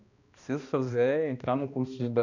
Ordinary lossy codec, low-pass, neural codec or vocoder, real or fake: none; 7.2 kHz; codec, 16 kHz, 1 kbps, X-Codec, HuBERT features, trained on balanced general audio; fake